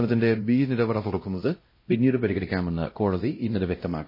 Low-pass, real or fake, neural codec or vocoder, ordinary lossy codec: 5.4 kHz; fake; codec, 16 kHz, 0.5 kbps, X-Codec, WavLM features, trained on Multilingual LibriSpeech; MP3, 24 kbps